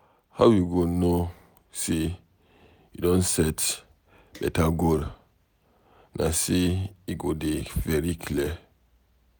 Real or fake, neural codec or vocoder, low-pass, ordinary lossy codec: real; none; none; none